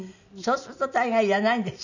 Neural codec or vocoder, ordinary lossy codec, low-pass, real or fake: none; none; 7.2 kHz; real